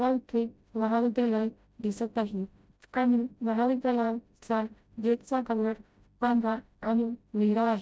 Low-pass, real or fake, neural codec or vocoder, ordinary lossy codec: none; fake; codec, 16 kHz, 0.5 kbps, FreqCodec, smaller model; none